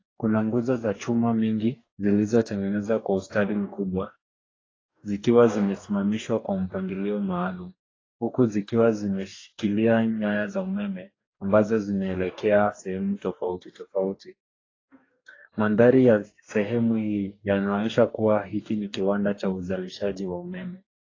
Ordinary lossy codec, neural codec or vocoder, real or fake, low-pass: AAC, 32 kbps; codec, 44.1 kHz, 2.6 kbps, DAC; fake; 7.2 kHz